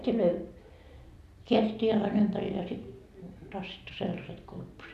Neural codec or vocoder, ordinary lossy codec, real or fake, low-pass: none; none; real; 14.4 kHz